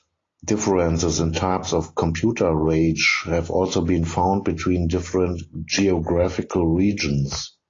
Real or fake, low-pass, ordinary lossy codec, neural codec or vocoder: real; 7.2 kHz; AAC, 32 kbps; none